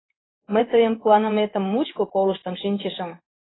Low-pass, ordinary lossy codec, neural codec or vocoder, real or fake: 7.2 kHz; AAC, 16 kbps; codec, 16 kHz in and 24 kHz out, 1 kbps, XY-Tokenizer; fake